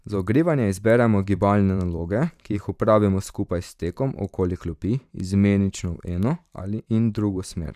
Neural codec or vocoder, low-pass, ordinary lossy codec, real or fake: vocoder, 44.1 kHz, 128 mel bands every 512 samples, BigVGAN v2; 14.4 kHz; none; fake